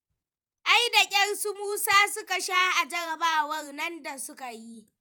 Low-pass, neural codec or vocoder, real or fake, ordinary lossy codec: none; vocoder, 48 kHz, 128 mel bands, Vocos; fake; none